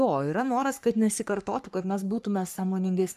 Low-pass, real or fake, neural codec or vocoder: 14.4 kHz; fake; codec, 44.1 kHz, 3.4 kbps, Pupu-Codec